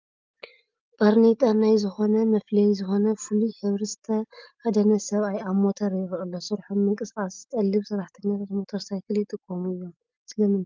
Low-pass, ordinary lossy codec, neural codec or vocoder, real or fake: 7.2 kHz; Opus, 32 kbps; none; real